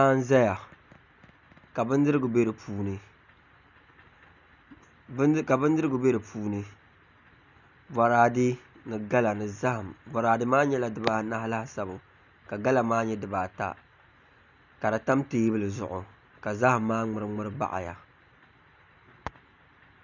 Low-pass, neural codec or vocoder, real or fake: 7.2 kHz; none; real